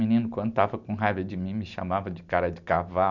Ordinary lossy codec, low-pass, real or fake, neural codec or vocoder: none; 7.2 kHz; real; none